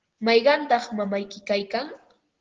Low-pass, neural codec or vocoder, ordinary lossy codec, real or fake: 7.2 kHz; none; Opus, 16 kbps; real